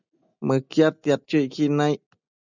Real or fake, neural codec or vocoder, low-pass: real; none; 7.2 kHz